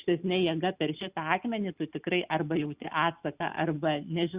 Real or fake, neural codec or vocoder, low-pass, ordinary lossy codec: real; none; 3.6 kHz; Opus, 64 kbps